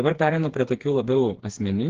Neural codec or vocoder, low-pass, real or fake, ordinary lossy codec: codec, 16 kHz, 4 kbps, FreqCodec, smaller model; 7.2 kHz; fake; Opus, 16 kbps